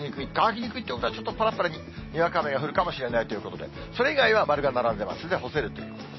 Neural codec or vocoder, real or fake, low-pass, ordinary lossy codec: none; real; 7.2 kHz; MP3, 24 kbps